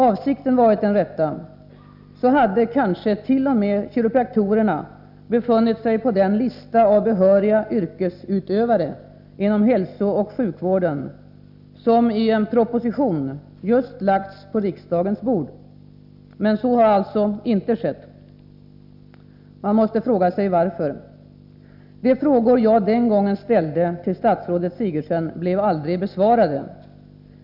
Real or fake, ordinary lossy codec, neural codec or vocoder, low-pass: real; none; none; 5.4 kHz